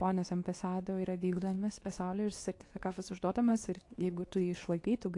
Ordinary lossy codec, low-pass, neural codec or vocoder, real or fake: AAC, 48 kbps; 10.8 kHz; codec, 24 kHz, 0.9 kbps, WavTokenizer, medium speech release version 2; fake